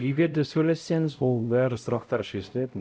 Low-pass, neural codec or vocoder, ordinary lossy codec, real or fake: none; codec, 16 kHz, 0.5 kbps, X-Codec, HuBERT features, trained on LibriSpeech; none; fake